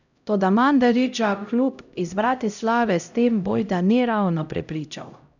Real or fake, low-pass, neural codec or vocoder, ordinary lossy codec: fake; 7.2 kHz; codec, 16 kHz, 0.5 kbps, X-Codec, HuBERT features, trained on LibriSpeech; none